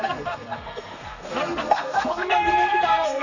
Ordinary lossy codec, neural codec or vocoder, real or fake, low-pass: none; codec, 32 kHz, 1.9 kbps, SNAC; fake; 7.2 kHz